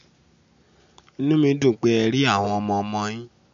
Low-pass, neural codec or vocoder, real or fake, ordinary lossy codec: 7.2 kHz; none; real; MP3, 48 kbps